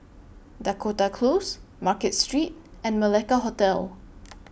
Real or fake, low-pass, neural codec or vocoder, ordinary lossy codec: real; none; none; none